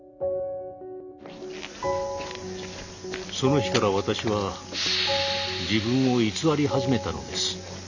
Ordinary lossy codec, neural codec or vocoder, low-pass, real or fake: none; none; 7.2 kHz; real